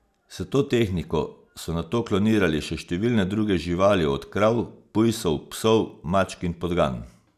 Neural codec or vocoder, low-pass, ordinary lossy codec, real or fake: none; 14.4 kHz; none; real